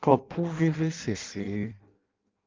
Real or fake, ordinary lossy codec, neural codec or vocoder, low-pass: fake; Opus, 32 kbps; codec, 16 kHz in and 24 kHz out, 0.6 kbps, FireRedTTS-2 codec; 7.2 kHz